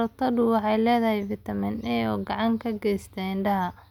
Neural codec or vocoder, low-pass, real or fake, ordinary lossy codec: none; 19.8 kHz; real; none